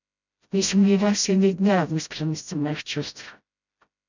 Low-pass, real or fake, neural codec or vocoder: 7.2 kHz; fake; codec, 16 kHz, 0.5 kbps, FreqCodec, smaller model